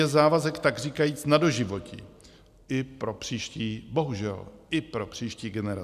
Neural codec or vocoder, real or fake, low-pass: none; real; 14.4 kHz